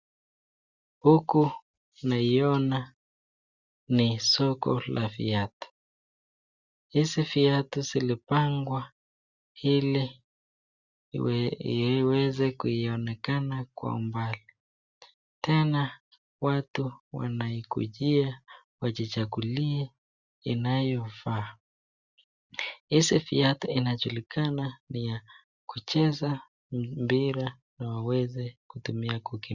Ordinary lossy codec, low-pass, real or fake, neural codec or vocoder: Opus, 64 kbps; 7.2 kHz; real; none